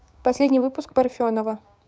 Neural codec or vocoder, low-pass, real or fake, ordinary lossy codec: codec, 16 kHz, 6 kbps, DAC; none; fake; none